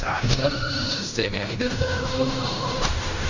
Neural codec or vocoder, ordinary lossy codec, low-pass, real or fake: codec, 16 kHz in and 24 kHz out, 0.4 kbps, LongCat-Audio-Codec, fine tuned four codebook decoder; none; 7.2 kHz; fake